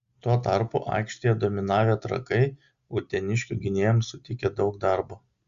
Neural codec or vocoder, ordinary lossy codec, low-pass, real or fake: none; Opus, 64 kbps; 7.2 kHz; real